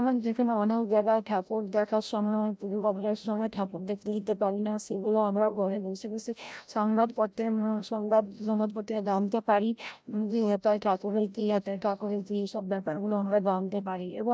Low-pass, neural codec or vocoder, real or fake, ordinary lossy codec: none; codec, 16 kHz, 0.5 kbps, FreqCodec, larger model; fake; none